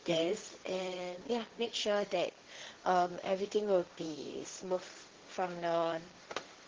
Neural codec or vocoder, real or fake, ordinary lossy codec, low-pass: codec, 16 kHz, 1.1 kbps, Voila-Tokenizer; fake; Opus, 16 kbps; 7.2 kHz